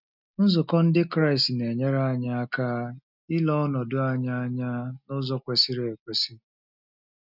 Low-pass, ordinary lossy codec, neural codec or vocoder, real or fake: 5.4 kHz; none; none; real